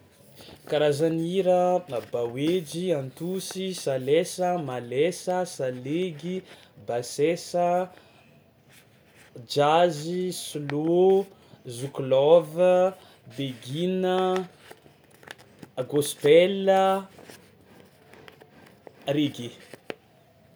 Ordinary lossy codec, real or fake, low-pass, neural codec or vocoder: none; real; none; none